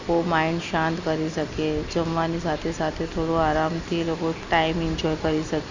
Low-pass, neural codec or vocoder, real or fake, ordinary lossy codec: 7.2 kHz; none; real; Opus, 64 kbps